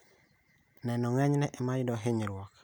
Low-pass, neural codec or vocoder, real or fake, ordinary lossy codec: none; none; real; none